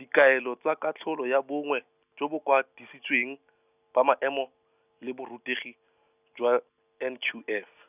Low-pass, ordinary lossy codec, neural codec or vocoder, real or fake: 3.6 kHz; none; none; real